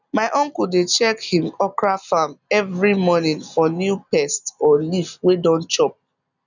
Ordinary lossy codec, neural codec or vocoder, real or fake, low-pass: none; none; real; 7.2 kHz